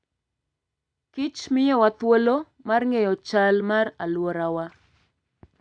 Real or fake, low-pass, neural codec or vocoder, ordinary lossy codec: real; 9.9 kHz; none; none